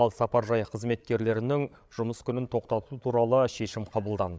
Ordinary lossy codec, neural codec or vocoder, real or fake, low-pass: none; codec, 16 kHz, 8 kbps, FreqCodec, larger model; fake; none